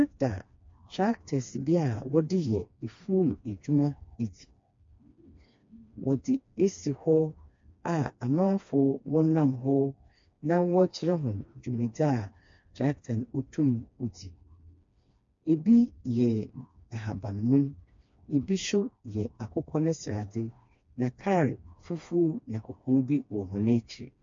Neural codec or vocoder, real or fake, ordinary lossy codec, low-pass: codec, 16 kHz, 2 kbps, FreqCodec, smaller model; fake; MP3, 48 kbps; 7.2 kHz